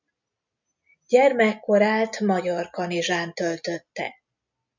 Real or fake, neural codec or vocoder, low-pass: real; none; 7.2 kHz